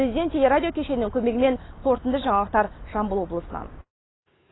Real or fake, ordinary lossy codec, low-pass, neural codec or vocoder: real; AAC, 16 kbps; 7.2 kHz; none